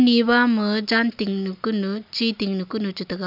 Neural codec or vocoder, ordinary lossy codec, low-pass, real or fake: none; none; 5.4 kHz; real